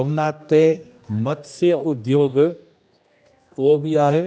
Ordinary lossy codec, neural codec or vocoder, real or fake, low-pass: none; codec, 16 kHz, 1 kbps, X-Codec, HuBERT features, trained on general audio; fake; none